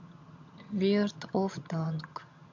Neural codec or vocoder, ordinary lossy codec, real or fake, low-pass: vocoder, 22.05 kHz, 80 mel bands, HiFi-GAN; MP3, 48 kbps; fake; 7.2 kHz